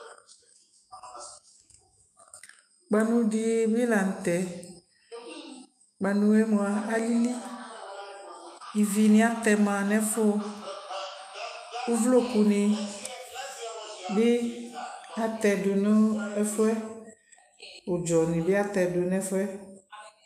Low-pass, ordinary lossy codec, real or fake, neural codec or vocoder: 14.4 kHz; MP3, 96 kbps; fake; autoencoder, 48 kHz, 128 numbers a frame, DAC-VAE, trained on Japanese speech